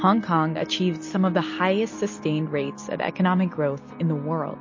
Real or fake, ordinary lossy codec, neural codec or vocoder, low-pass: real; MP3, 32 kbps; none; 7.2 kHz